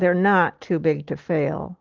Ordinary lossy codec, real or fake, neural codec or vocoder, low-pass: Opus, 16 kbps; real; none; 7.2 kHz